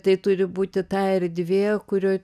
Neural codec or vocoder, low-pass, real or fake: none; 14.4 kHz; real